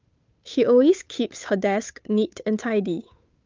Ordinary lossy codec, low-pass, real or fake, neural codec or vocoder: Opus, 24 kbps; 7.2 kHz; fake; codec, 16 kHz, 8 kbps, FunCodec, trained on Chinese and English, 25 frames a second